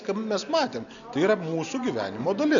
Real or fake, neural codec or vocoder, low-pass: real; none; 7.2 kHz